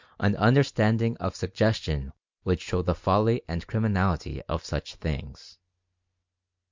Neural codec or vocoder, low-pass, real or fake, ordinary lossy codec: none; 7.2 kHz; real; MP3, 64 kbps